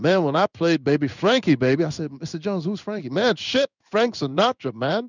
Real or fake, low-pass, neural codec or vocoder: fake; 7.2 kHz; codec, 16 kHz in and 24 kHz out, 1 kbps, XY-Tokenizer